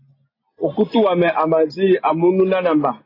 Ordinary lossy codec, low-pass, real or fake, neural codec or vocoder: AAC, 24 kbps; 5.4 kHz; real; none